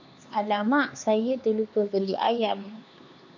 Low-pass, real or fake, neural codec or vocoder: 7.2 kHz; fake; codec, 16 kHz, 4 kbps, X-Codec, HuBERT features, trained on LibriSpeech